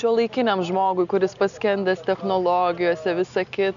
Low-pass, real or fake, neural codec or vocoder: 7.2 kHz; real; none